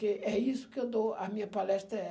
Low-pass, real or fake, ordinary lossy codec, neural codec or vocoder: none; real; none; none